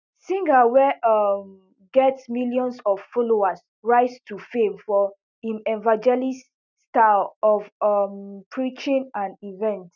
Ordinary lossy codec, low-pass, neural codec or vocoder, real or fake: none; 7.2 kHz; none; real